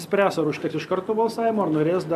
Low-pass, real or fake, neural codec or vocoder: 14.4 kHz; real; none